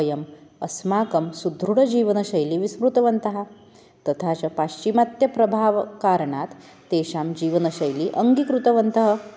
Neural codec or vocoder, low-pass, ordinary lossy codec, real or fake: none; none; none; real